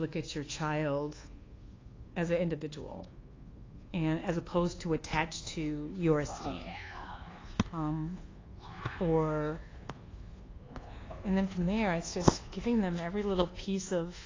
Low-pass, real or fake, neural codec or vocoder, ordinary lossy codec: 7.2 kHz; fake; codec, 24 kHz, 1.2 kbps, DualCodec; AAC, 32 kbps